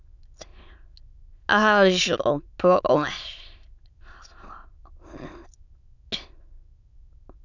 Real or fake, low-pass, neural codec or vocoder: fake; 7.2 kHz; autoencoder, 22.05 kHz, a latent of 192 numbers a frame, VITS, trained on many speakers